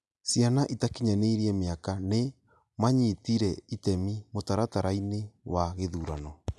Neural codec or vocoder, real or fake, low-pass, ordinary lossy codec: none; real; none; none